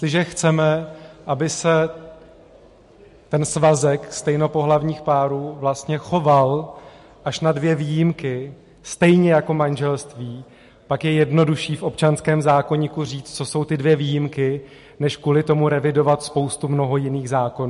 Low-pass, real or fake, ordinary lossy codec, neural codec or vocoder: 14.4 kHz; real; MP3, 48 kbps; none